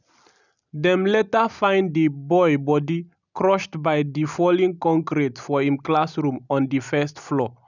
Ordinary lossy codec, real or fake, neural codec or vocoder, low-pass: none; real; none; 7.2 kHz